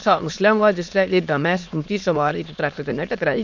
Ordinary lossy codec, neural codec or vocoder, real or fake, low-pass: MP3, 64 kbps; autoencoder, 22.05 kHz, a latent of 192 numbers a frame, VITS, trained on many speakers; fake; 7.2 kHz